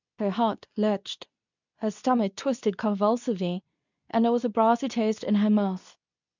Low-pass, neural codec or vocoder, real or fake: 7.2 kHz; codec, 24 kHz, 0.9 kbps, WavTokenizer, medium speech release version 2; fake